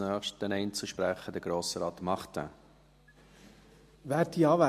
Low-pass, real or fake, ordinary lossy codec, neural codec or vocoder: 14.4 kHz; real; MP3, 64 kbps; none